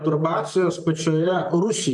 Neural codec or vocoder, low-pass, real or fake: vocoder, 44.1 kHz, 128 mel bands, Pupu-Vocoder; 10.8 kHz; fake